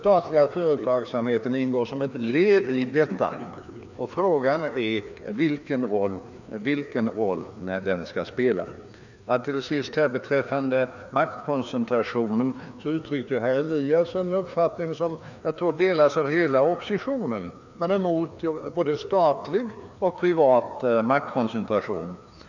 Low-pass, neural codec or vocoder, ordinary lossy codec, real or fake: 7.2 kHz; codec, 16 kHz, 2 kbps, FreqCodec, larger model; none; fake